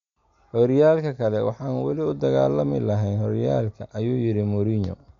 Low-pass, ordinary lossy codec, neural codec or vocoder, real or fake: 7.2 kHz; none; none; real